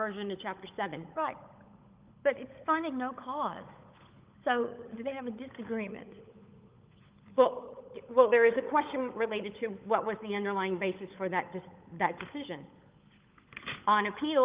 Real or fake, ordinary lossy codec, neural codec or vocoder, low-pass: fake; Opus, 32 kbps; codec, 16 kHz, 16 kbps, FunCodec, trained on LibriTTS, 50 frames a second; 3.6 kHz